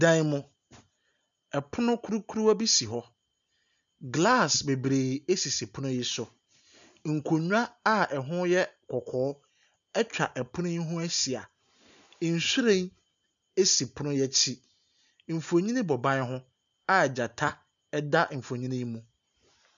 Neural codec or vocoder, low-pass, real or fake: none; 7.2 kHz; real